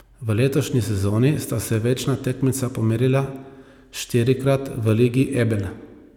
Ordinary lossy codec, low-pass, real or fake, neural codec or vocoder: none; 19.8 kHz; real; none